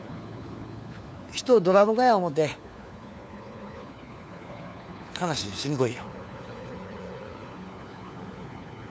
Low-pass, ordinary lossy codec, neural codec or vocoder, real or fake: none; none; codec, 16 kHz, 4 kbps, FunCodec, trained on LibriTTS, 50 frames a second; fake